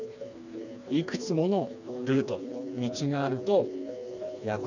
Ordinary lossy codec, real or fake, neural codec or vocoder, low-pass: none; fake; codec, 16 kHz, 2 kbps, FreqCodec, smaller model; 7.2 kHz